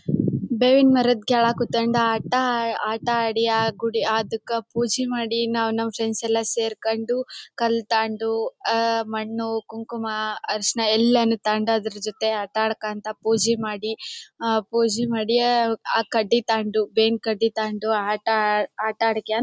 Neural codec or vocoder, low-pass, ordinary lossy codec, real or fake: none; none; none; real